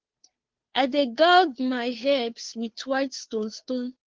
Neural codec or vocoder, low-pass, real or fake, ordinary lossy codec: codec, 16 kHz, 2 kbps, FunCodec, trained on Chinese and English, 25 frames a second; 7.2 kHz; fake; Opus, 16 kbps